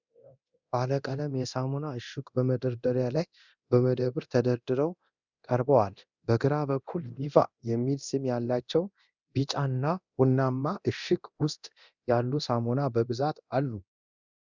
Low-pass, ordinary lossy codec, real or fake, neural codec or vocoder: 7.2 kHz; Opus, 64 kbps; fake; codec, 24 kHz, 0.9 kbps, DualCodec